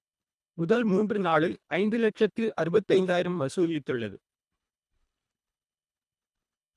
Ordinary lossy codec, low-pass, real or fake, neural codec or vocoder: none; none; fake; codec, 24 kHz, 1.5 kbps, HILCodec